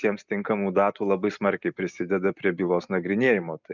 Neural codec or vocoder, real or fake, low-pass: none; real; 7.2 kHz